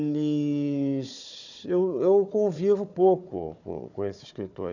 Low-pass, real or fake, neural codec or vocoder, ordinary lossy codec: 7.2 kHz; fake; codec, 16 kHz, 4 kbps, FunCodec, trained on Chinese and English, 50 frames a second; none